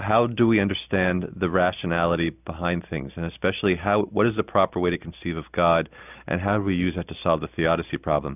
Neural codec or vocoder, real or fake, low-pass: none; real; 3.6 kHz